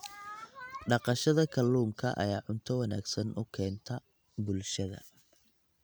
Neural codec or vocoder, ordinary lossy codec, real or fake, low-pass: none; none; real; none